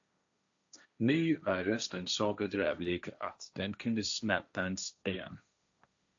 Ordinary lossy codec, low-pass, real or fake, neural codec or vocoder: AAC, 64 kbps; 7.2 kHz; fake; codec, 16 kHz, 1.1 kbps, Voila-Tokenizer